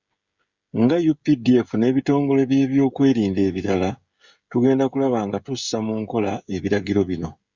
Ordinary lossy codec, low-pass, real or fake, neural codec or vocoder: Opus, 64 kbps; 7.2 kHz; fake; codec, 16 kHz, 16 kbps, FreqCodec, smaller model